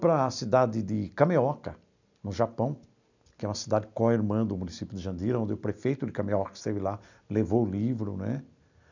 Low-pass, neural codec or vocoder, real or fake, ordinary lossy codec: 7.2 kHz; none; real; none